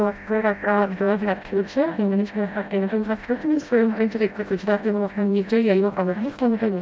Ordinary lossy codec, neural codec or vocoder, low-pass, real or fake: none; codec, 16 kHz, 0.5 kbps, FreqCodec, smaller model; none; fake